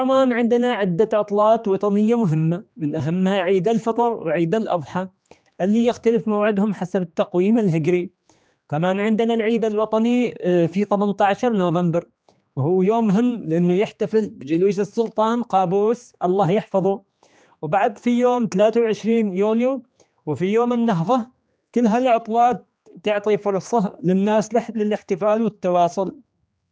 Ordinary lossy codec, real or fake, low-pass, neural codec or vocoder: none; fake; none; codec, 16 kHz, 2 kbps, X-Codec, HuBERT features, trained on general audio